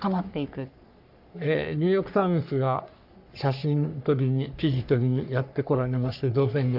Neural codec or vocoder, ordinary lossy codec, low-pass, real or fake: codec, 44.1 kHz, 3.4 kbps, Pupu-Codec; none; 5.4 kHz; fake